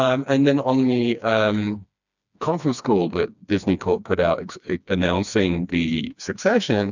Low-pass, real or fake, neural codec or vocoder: 7.2 kHz; fake; codec, 16 kHz, 2 kbps, FreqCodec, smaller model